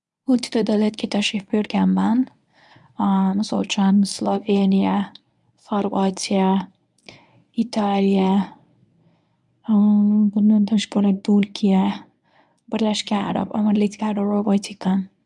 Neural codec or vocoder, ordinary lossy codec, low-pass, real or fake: codec, 24 kHz, 0.9 kbps, WavTokenizer, medium speech release version 1; none; 10.8 kHz; fake